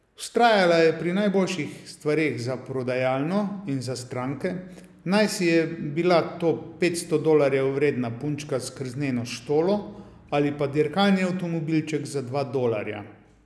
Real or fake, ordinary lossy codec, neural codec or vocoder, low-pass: real; none; none; none